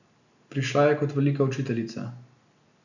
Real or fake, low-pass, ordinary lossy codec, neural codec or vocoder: real; 7.2 kHz; none; none